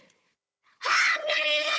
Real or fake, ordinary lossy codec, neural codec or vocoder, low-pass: fake; none; codec, 16 kHz, 4 kbps, FunCodec, trained on Chinese and English, 50 frames a second; none